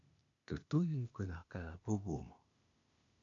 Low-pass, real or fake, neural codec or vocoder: 7.2 kHz; fake; codec, 16 kHz, 0.8 kbps, ZipCodec